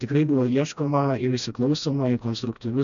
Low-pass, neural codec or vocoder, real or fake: 7.2 kHz; codec, 16 kHz, 1 kbps, FreqCodec, smaller model; fake